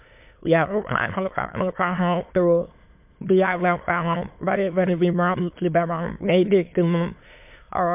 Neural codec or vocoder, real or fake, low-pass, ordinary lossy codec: autoencoder, 22.05 kHz, a latent of 192 numbers a frame, VITS, trained on many speakers; fake; 3.6 kHz; MP3, 32 kbps